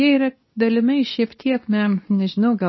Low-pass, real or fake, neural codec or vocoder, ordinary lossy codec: 7.2 kHz; fake; codec, 24 kHz, 0.9 kbps, WavTokenizer, medium speech release version 2; MP3, 24 kbps